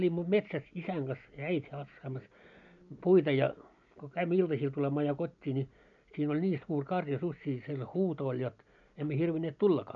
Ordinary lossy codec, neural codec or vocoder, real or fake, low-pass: MP3, 96 kbps; none; real; 7.2 kHz